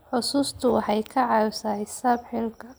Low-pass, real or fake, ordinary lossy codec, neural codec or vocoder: none; real; none; none